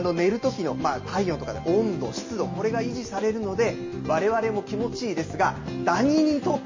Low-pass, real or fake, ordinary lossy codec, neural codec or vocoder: 7.2 kHz; real; MP3, 32 kbps; none